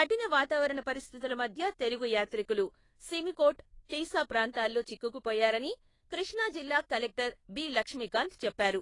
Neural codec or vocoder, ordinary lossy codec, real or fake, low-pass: codec, 24 kHz, 1.2 kbps, DualCodec; AAC, 32 kbps; fake; 10.8 kHz